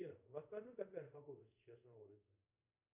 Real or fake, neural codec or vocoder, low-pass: fake; codec, 24 kHz, 0.5 kbps, DualCodec; 3.6 kHz